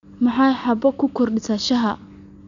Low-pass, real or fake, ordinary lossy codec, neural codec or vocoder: 7.2 kHz; real; none; none